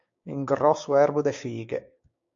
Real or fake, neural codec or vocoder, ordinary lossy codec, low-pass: fake; codec, 16 kHz, 6 kbps, DAC; AAC, 64 kbps; 7.2 kHz